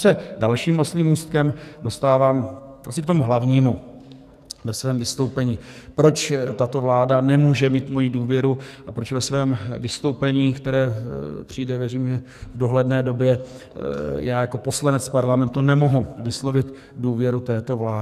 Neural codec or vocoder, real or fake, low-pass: codec, 44.1 kHz, 2.6 kbps, SNAC; fake; 14.4 kHz